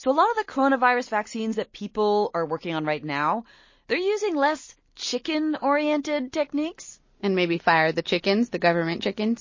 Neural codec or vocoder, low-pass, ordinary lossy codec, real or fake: none; 7.2 kHz; MP3, 32 kbps; real